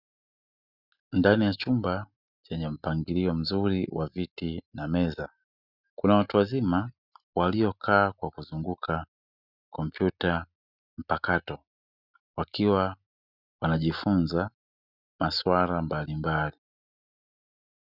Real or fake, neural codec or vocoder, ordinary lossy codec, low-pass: real; none; Opus, 64 kbps; 5.4 kHz